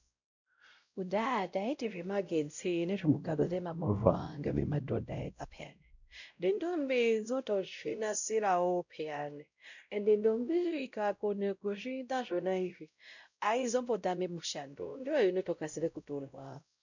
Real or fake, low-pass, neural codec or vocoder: fake; 7.2 kHz; codec, 16 kHz, 0.5 kbps, X-Codec, WavLM features, trained on Multilingual LibriSpeech